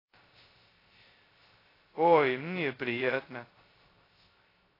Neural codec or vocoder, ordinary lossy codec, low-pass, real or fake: codec, 16 kHz, 0.2 kbps, FocalCodec; AAC, 24 kbps; 5.4 kHz; fake